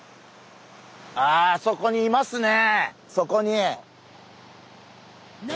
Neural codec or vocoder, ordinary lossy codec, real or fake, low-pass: none; none; real; none